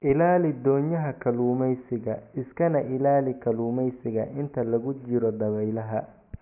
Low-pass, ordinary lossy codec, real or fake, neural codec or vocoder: 3.6 kHz; none; real; none